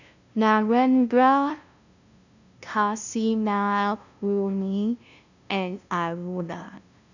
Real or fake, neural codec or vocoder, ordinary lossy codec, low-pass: fake; codec, 16 kHz, 0.5 kbps, FunCodec, trained on LibriTTS, 25 frames a second; none; 7.2 kHz